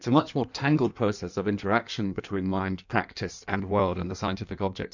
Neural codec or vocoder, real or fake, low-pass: codec, 16 kHz in and 24 kHz out, 1.1 kbps, FireRedTTS-2 codec; fake; 7.2 kHz